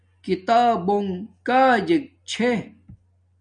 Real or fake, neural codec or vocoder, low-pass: real; none; 9.9 kHz